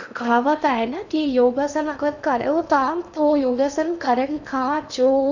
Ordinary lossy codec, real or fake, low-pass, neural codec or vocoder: none; fake; 7.2 kHz; codec, 16 kHz in and 24 kHz out, 0.8 kbps, FocalCodec, streaming, 65536 codes